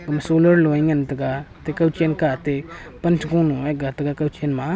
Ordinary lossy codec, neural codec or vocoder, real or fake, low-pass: none; none; real; none